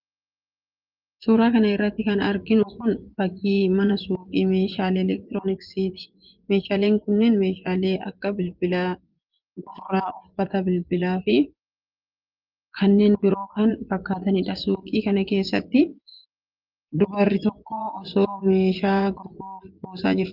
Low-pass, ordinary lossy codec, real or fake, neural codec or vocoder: 5.4 kHz; Opus, 32 kbps; fake; vocoder, 24 kHz, 100 mel bands, Vocos